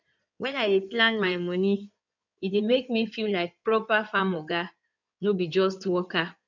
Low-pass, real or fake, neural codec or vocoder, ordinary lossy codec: 7.2 kHz; fake; codec, 16 kHz in and 24 kHz out, 2.2 kbps, FireRedTTS-2 codec; none